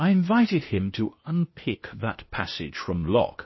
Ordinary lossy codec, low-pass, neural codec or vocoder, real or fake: MP3, 24 kbps; 7.2 kHz; codec, 16 kHz, 0.8 kbps, ZipCodec; fake